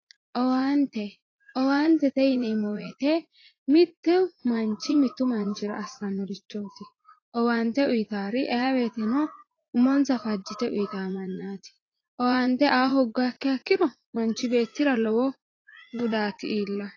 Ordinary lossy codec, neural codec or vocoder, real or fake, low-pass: AAC, 32 kbps; vocoder, 44.1 kHz, 80 mel bands, Vocos; fake; 7.2 kHz